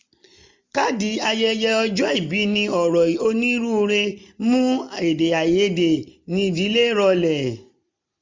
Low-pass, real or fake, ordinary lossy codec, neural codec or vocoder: 7.2 kHz; real; MP3, 64 kbps; none